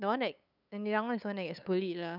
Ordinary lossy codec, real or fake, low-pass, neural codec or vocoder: none; fake; 5.4 kHz; codec, 16 kHz, 8 kbps, FunCodec, trained on LibriTTS, 25 frames a second